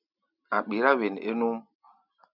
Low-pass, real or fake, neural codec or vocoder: 5.4 kHz; real; none